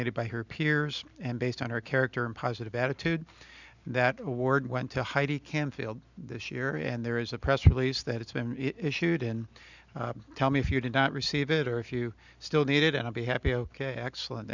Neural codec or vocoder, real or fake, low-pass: none; real; 7.2 kHz